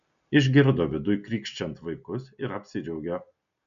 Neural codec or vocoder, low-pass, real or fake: none; 7.2 kHz; real